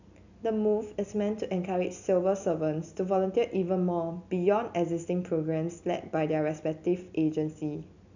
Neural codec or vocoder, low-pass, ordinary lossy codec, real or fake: none; 7.2 kHz; none; real